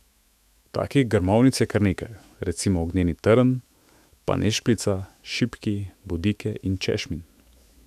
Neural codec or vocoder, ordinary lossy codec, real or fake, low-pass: autoencoder, 48 kHz, 128 numbers a frame, DAC-VAE, trained on Japanese speech; none; fake; 14.4 kHz